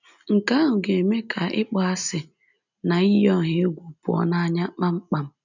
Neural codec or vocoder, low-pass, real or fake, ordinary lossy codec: none; 7.2 kHz; real; none